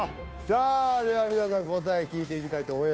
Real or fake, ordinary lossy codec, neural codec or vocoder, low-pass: fake; none; codec, 16 kHz, 2 kbps, FunCodec, trained on Chinese and English, 25 frames a second; none